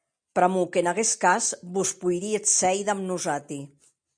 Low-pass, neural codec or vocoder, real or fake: 9.9 kHz; none; real